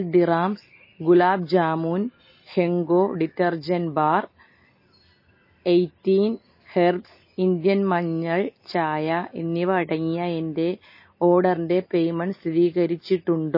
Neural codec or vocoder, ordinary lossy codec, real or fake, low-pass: none; MP3, 24 kbps; real; 5.4 kHz